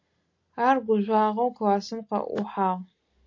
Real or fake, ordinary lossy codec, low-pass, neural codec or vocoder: real; AAC, 48 kbps; 7.2 kHz; none